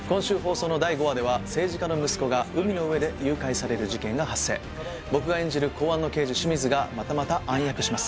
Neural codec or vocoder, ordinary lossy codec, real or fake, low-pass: none; none; real; none